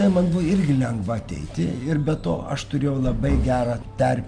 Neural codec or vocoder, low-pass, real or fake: none; 9.9 kHz; real